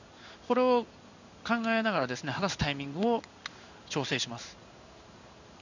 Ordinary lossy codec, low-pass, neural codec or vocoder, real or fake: none; 7.2 kHz; codec, 16 kHz in and 24 kHz out, 1 kbps, XY-Tokenizer; fake